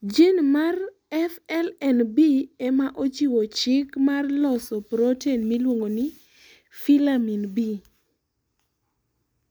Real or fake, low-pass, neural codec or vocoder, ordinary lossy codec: real; none; none; none